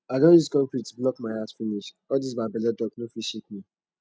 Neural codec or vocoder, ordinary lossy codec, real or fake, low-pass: none; none; real; none